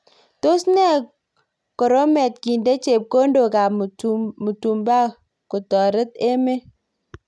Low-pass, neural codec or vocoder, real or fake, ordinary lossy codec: none; none; real; none